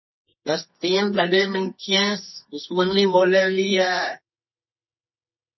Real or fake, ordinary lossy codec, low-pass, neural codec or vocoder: fake; MP3, 24 kbps; 7.2 kHz; codec, 24 kHz, 0.9 kbps, WavTokenizer, medium music audio release